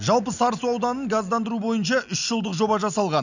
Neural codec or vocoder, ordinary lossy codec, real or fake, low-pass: none; none; real; 7.2 kHz